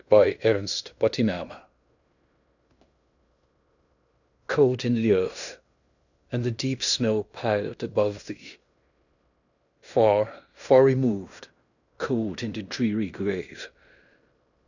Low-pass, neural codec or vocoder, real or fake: 7.2 kHz; codec, 16 kHz in and 24 kHz out, 0.9 kbps, LongCat-Audio-Codec, fine tuned four codebook decoder; fake